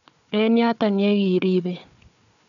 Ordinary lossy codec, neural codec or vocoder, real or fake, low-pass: none; codec, 16 kHz, 4 kbps, FunCodec, trained on Chinese and English, 50 frames a second; fake; 7.2 kHz